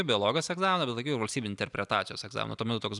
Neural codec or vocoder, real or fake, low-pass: none; real; 10.8 kHz